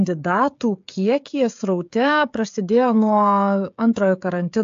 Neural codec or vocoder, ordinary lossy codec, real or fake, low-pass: codec, 16 kHz, 16 kbps, FreqCodec, smaller model; AAC, 64 kbps; fake; 7.2 kHz